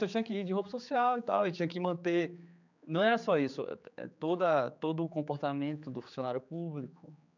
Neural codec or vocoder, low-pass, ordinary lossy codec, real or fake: codec, 16 kHz, 4 kbps, X-Codec, HuBERT features, trained on general audio; 7.2 kHz; none; fake